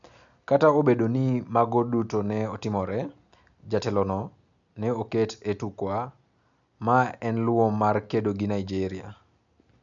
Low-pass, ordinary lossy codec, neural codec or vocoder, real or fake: 7.2 kHz; none; none; real